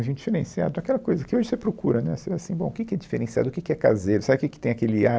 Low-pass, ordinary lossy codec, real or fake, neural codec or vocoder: none; none; real; none